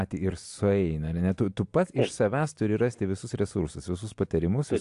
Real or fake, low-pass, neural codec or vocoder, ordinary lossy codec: real; 10.8 kHz; none; AAC, 64 kbps